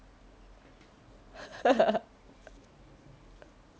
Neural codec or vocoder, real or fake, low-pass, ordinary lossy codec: none; real; none; none